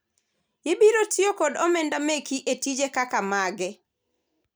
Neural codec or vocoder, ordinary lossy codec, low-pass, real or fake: none; none; none; real